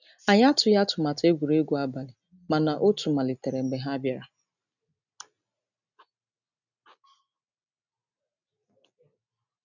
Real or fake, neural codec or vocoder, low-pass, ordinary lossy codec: real; none; 7.2 kHz; none